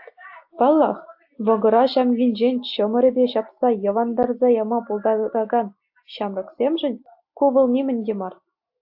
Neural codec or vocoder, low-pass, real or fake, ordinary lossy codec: none; 5.4 kHz; real; AAC, 48 kbps